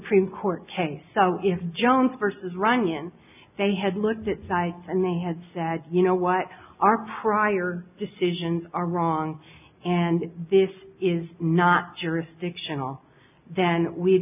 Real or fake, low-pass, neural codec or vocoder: real; 3.6 kHz; none